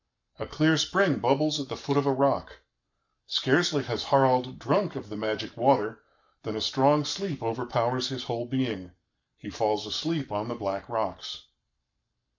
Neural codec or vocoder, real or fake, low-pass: codec, 44.1 kHz, 7.8 kbps, Pupu-Codec; fake; 7.2 kHz